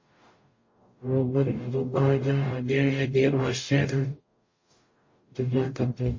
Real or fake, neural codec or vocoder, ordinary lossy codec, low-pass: fake; codec, 44.1 kHz, 0.9 kbps, DAC; MP3, 32 kbps; 7.2 kHz